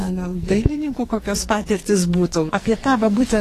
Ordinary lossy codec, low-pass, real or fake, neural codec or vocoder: AAC, 48 kbps; 14.4 kHz; fake; codec, 44.1 kHz, 2.6 kbps, SNAC